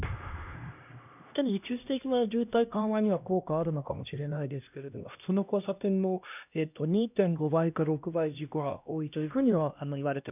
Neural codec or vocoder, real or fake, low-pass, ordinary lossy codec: codec, 16 kHz, 1 kbps, X-Codec, HuBERT features, trained on LibriSpeech; fake; 3.6 kHz; none